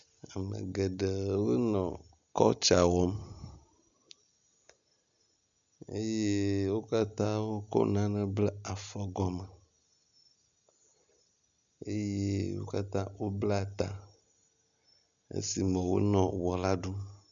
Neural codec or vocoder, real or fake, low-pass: none; real; 7.2 kHz